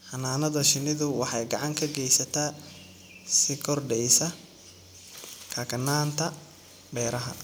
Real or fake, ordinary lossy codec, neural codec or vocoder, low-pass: real; none; none; none